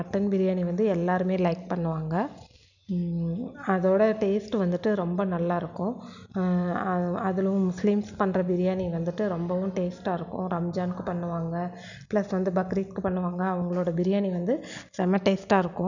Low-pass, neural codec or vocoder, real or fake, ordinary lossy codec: 7.2 kHz; none; real; none